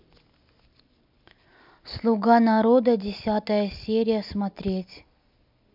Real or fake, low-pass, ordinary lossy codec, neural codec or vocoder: real; 5.4 kHz; MP3, 48 kbps; none